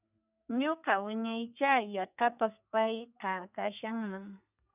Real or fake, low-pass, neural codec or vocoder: fake; 3.6 kHz; codec, 32 kHz, 1.9 kbps, SNAC